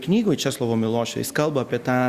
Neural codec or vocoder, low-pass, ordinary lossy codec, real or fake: none; 14.4 kHz; Opus, 64 kbps; real